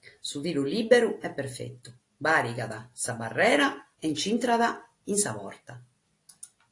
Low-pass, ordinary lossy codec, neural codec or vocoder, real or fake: 10.8 kHz; AAC, 48 kbps; none; real